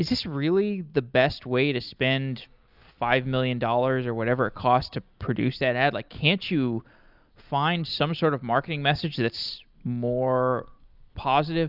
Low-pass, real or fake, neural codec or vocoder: 5.4 kHz; real; none